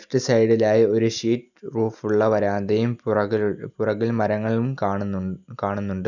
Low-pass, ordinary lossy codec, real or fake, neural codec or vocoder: 7.2 kHz; none; real; none